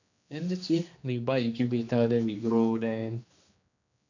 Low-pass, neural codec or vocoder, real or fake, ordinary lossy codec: 7.2 kHz; codec, 16 kHz, 2 kbps, X-Codec, HuBERT features, trained on general audio; fake; none